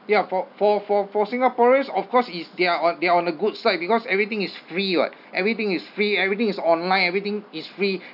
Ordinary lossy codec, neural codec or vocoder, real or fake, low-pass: none; none; real; 5.4 kHz